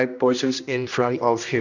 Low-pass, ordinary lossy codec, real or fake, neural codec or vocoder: 7.2 kHz; none; fake; codec, 16 kHz, 1 kbps, X-Codec, HuBERT features, trained on general audio